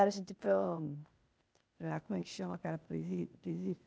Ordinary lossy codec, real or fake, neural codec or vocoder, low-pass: none; fake; codec, 16 kHz, 0.8 kbps, ZipCodec; none